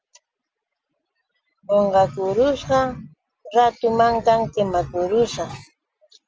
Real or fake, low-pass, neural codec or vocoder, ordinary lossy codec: real; 7.2 kHz; none; Opus, 24 kbps